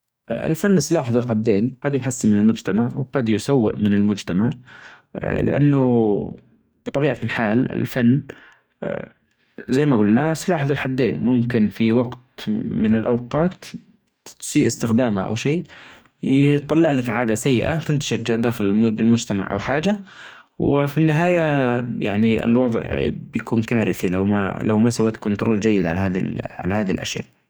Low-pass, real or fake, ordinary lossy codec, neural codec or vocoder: none; fake; none; codec, 44.1 kHz, 2.6 kbps, DAC